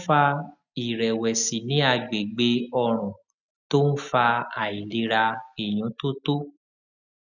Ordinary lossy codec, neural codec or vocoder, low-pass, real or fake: none; none; 7.2 kHz; real